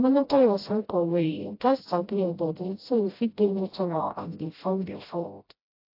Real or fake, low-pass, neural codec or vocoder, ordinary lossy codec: fake; 5.4 kHz; codec, 16 kHz, 0.5 kbps, FreqCodec, smaller model; AAC, 32 kbps